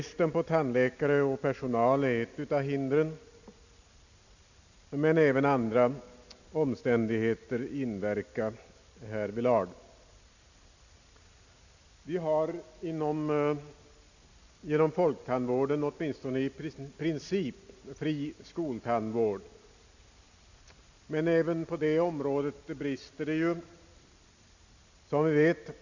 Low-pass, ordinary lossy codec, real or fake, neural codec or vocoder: 7.2 kHz; none; real; none